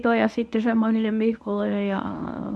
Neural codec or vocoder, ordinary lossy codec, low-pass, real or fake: codec, 24 kHz, 0.9 kbps, WavTokenizer, medium speech release version 1; none; none; fake